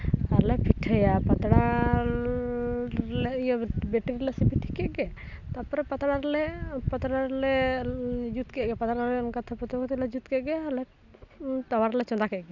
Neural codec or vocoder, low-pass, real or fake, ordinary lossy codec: none; 7.2 kHz; real; none